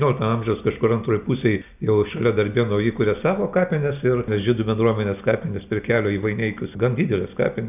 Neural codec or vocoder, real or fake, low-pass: none; real; 3.6 kHz